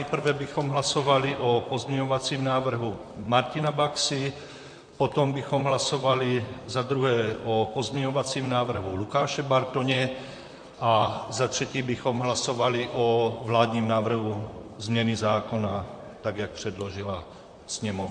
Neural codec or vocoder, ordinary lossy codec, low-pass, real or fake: vocoder, 44.1 kHz, 128 mel bands, Pupu-Vocoder; MP3, 48 kbps; 9.9 kHz; fake